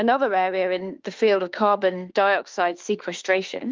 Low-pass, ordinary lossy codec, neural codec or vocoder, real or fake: 7.2 kHz; Opus, 32 kbps; autoencoder, 48 kHz, 32 numbers a frame, DAC-VAE, trained on Japanese speech; fake